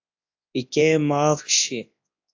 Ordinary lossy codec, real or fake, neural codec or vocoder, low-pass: AAC, 48 kbps; fake; codec, 24 kHz, 0.9 kbps, WavTokenizer, large speech release; 7.2 kHz